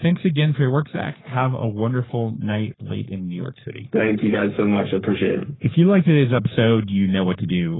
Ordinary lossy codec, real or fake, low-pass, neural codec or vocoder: AAC, 16 kbps; fake; 7.2 kHz; codec, 44.1 kHz, 3.4 kbps, Pupu-Codec